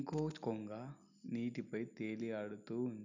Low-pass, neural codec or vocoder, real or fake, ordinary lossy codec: 7.2 kHz; none; real; none